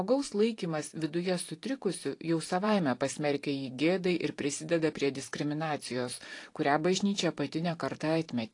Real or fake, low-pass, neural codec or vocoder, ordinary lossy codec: fake; 10.8 kHz; vocoder, 44.1 kHz, 128 mel bands every 512 samples, BigVGAN v2; AAC, 48 kbps